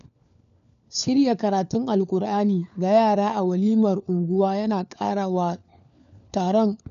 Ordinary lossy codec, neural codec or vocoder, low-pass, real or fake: none; codec, 16 kHz, 4 kbps, FunCodec, trained on LibriTTS, 50 frames a second; 7.2 kHz; fake